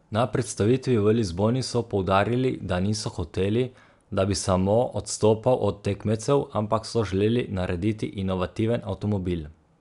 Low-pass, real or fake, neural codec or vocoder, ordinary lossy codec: 10.8 kHz; real; none; none